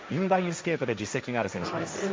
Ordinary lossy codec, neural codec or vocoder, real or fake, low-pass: none; codec, 16 kHz, 1.1 kbps, Voila-Tokenizer; fake; none